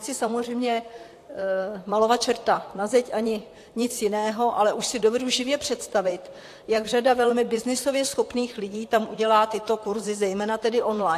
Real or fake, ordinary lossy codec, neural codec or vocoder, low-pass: fake; AAC, 64 kbps; vocoder, 44.1 kHz, 128 mel bands, Pupu-Vocoder; 14.4 kHz